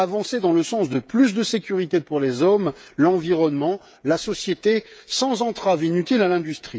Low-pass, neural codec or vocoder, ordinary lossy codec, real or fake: none; codec, 16 kHz, 8 kbps, FreqCodec, smaller model; none; fake